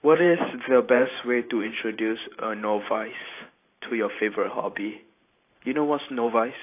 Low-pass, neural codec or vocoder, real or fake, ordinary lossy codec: 3.6 kHz; vocoder, 44.1 kHz, 128 mel bands every 512 samples, BigVGAN v2; fake; AAC, 24 kbps